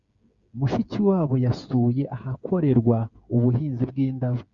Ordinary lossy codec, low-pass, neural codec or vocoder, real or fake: Opus, 64 kbps; 7.2 kHz; codec, 16 kHz, 8 kbps, FreqCodec, smaller model; fake